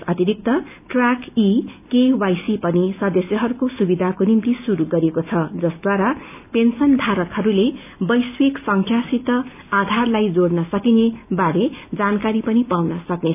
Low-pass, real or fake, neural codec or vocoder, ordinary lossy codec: 3.6 kHz; real; none; none